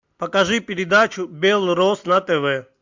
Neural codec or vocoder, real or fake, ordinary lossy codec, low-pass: none; real; MP3, 64 kbps; 7.2 kHz